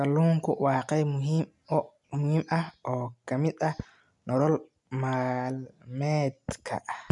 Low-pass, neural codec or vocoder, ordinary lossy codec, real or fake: 10.8 kHz; none; none; real